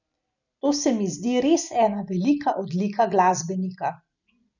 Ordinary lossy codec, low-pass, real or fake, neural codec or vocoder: none; 7.2 kHz; real; none